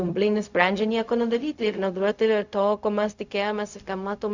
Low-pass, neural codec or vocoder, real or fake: 7.2 kHz; codec, 16 kHz, 0.4 kbps, LongCat-Audio-Codec; fake